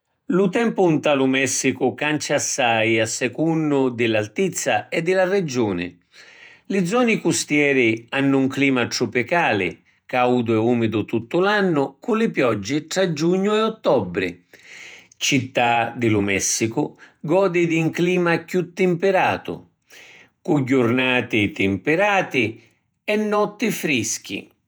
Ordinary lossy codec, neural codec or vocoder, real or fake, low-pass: none; vocoder, 48 kHz, 128 mel bands, Vocos; fake; none